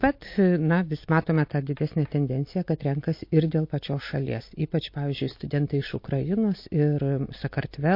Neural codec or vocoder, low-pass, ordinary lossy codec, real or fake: none; 5.4 kHz; MP3, 32 kbps; real